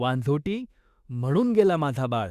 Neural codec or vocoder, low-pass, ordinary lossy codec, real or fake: autoencoder, 48 kHz, 32 numbers a frame, DAC-VAE, trained on Japanese speech; 14.4 kHz; Opus, 64 kbps; fake